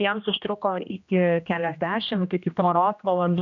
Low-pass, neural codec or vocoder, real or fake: 7.2 kHz; codec, 16 kHz, 1 kbps, X-Codec, HuBERT features, trained on general audio; fake